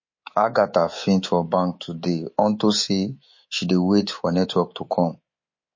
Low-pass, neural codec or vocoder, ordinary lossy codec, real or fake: 7.2 kHz; codec, 24 kHz, 3.1 kbps, DualCodec; MP3, 32 kbps; fake